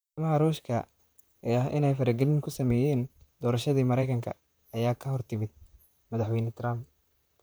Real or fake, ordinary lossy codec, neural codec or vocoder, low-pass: fake; none; vocoder, 44.1 kHz, 128 mel bands, Pupu-Vocoder; none